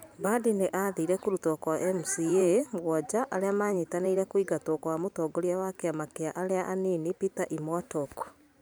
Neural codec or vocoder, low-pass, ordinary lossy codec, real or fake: vocoder, 44.1 kHz, 128 mel bands every 512 samples, BigVGAN v2; none; none; fake